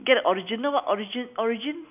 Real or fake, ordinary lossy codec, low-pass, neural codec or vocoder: real; none; 3.6 kHz; none